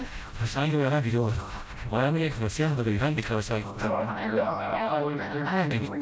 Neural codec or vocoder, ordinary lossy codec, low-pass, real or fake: codec, 16 kHz, 0.5 kbps, FreqCodec, smaller model; none; none; fake